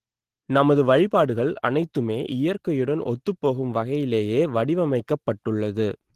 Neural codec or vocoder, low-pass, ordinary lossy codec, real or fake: none; 14.4 kHz; Opus, 16 kbps; real